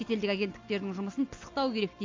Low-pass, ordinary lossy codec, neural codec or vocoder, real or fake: 7.2 kHz; AAC, 48 kbps; none; real